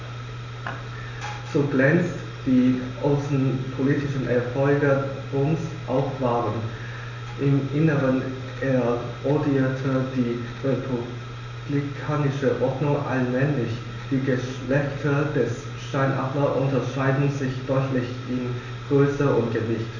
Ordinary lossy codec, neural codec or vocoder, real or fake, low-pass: none; none; real; 7.2 kHz